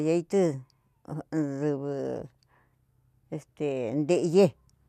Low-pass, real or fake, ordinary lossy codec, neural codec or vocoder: 14.4 kHz; real; none; none